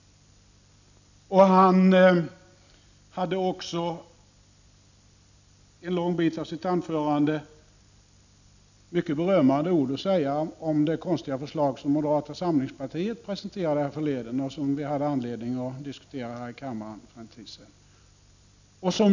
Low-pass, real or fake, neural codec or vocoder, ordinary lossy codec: 7.2 kHz; real; none; none